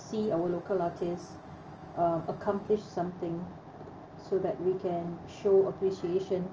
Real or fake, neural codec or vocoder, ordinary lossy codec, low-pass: real; none; Opus, 24 kbps; 7.2 kHz